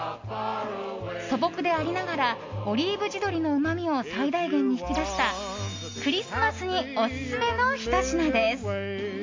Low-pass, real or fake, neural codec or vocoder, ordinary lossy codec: 7.2 kHz; real; none; MP3, 48 kbps